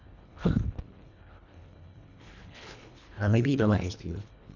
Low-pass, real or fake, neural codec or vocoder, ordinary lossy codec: 7.2 kHz; fake; codec, 24 kHz, 1.5 kbps, HILCodec; none